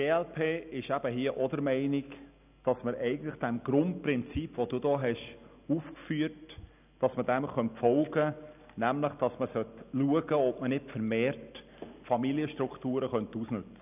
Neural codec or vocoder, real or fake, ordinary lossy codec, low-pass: none; real; none; 3.6 kHz